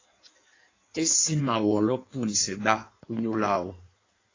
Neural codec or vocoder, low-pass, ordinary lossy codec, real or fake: codec, 16 kHz in and 24 kHz out, 1.1 kbps, FireRedTTS-2 codec; 7.2 kHz; AAC, 32 kbps; fake